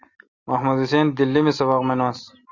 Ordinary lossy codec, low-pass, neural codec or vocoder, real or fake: Opus, 64 kbps; 7.2 kHz; none; real